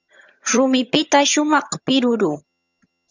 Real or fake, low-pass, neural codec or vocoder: fake; 7.2 kHz; vocoder, 22.05 kHz, 80 mel bands, HiFi-GAN